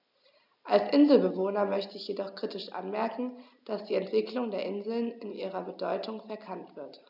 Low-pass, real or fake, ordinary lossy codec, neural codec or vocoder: 5.4 kHz; real; none; none